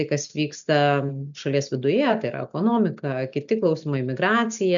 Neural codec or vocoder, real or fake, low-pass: none; real; 7.2 kHz